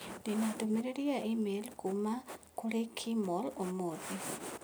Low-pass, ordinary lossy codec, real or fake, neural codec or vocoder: none; none; real; none